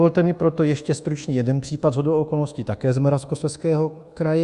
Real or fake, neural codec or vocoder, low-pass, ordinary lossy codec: fake; codec, 24 kHz, 1.2 kbps, DualCodec; 10.8 kHz; Opus, 64 kbps